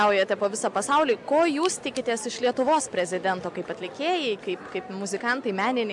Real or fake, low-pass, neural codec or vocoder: real; 10.8 kHz; none